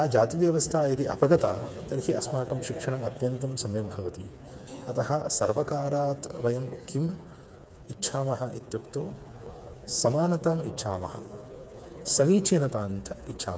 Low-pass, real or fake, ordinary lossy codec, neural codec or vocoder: none; fake; none; codec, 16 kHz, 4 kbps, FreqCodec, smaller model